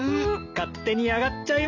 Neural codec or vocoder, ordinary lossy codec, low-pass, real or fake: none; none; 7.2 kHz; real